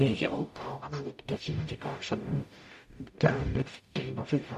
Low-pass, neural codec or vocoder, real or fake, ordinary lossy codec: 14.4 kHz; codec, 44.1 kHz, 0.9 kbps, DAC; fake; MP3, 64 kbps